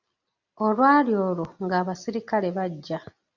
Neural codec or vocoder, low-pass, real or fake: none; 7.2 kHz; real